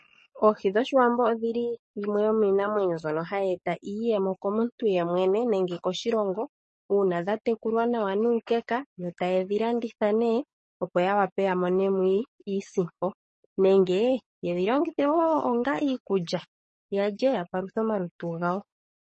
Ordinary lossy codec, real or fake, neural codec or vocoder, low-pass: MP3, 32 kbps; fake; codec, 44.1 kHz, 7.8 kbps, DAC; 10.8 kHz